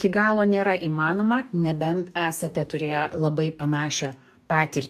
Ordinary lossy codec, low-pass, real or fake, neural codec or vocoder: AAC, 96 kbps; 14.4 kHz; fake; codec, 44.1 kHz, 2.6 kbps, DAC